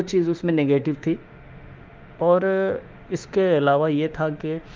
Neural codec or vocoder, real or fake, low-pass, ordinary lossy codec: autoencoder, 48 kHz, 32 numbers a frame, DAC-VAE, trained on Japanese speech; fake; 7.2 kHz; Opus, 32 kbps